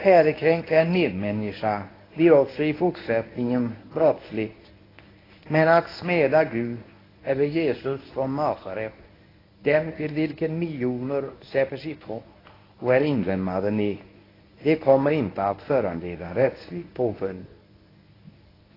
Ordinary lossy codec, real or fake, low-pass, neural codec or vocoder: AAC, 24 kbps; fake; 5.4 kHz; codec, 24 kHz, 0.9 kbps, WavTokenizer, medium speech release version 1